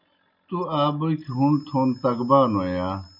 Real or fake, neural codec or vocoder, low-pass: real; none; 5.4 kHz